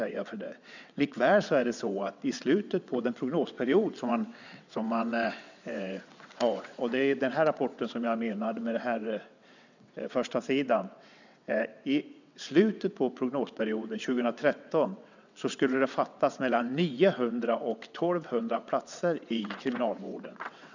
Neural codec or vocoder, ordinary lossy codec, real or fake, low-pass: vocoder, 22.05 kHz, 80 mel bands, WaveNeXt; none; fake; 7.2 kHz